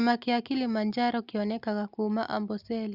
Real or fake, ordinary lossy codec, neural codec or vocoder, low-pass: real; Opus, 64 kbps; none; 5.4 kHz